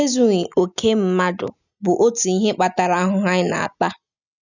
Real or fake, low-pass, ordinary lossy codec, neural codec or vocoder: real; 7.2 kHz; none; none